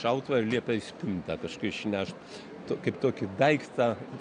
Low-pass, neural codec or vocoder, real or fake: 9.9 kHz; none; real